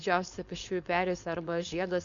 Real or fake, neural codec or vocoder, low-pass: fake; codec, 16 kHz, 4.8 kbps, FACodec; 7.2 kHz